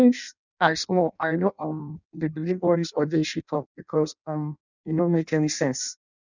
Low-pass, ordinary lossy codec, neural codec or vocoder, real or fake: 7.2 kHz; none; codec, 16 kHz in and 24 kHz out, 0.6 kbps, FireRedTTS-2 codec; fake